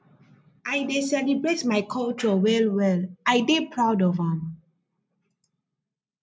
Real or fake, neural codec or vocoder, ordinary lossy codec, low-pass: real; none; none; none